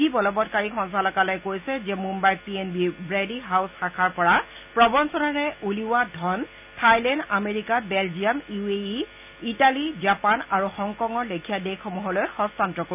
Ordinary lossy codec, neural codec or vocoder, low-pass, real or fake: none; none; 3.6 kHz; real